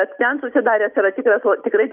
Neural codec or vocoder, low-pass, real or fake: none; 3.6 kHz; real